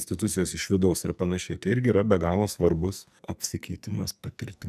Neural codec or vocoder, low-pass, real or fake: codec, 44.1 kHz, 2.6 kbps, SNAC; 14.4 kHz; fake